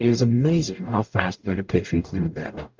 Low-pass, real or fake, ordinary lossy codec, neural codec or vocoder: 7.2 kHz; fake; Opus, 32 kbps; codec, 44.1 kHz, 0.9 kbps, DAC